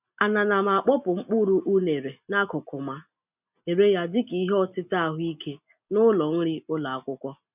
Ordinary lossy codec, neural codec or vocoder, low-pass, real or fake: none; none; 3.6 kHz; real